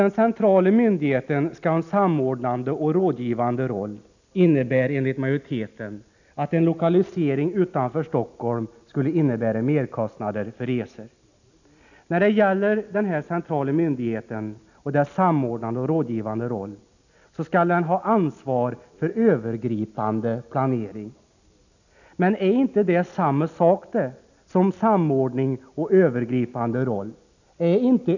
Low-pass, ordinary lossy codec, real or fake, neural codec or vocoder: 7.2 kHz; none; real; none